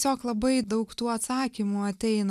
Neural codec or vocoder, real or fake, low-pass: none; real; 14.4 kHz